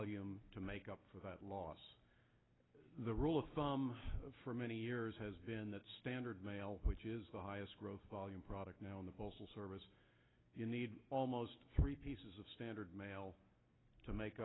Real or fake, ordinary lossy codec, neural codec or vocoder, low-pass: real; AAC, 16 kbps; none; 7.2 kHz